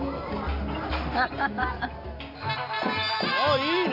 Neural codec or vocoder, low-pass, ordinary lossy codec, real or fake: none; 5.4 kHz; none; real